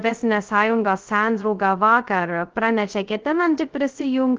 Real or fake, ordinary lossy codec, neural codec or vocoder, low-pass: fake; Opus, 16 kbps; codec, 16 kHz, 0.2 kbps, FocalCodec; 7.2 kHz